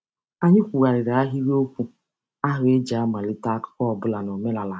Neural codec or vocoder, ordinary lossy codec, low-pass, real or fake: none; none; none; real